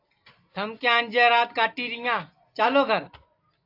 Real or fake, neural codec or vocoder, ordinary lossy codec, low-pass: real; none; AAC, 32 kbps; 5.4 kHz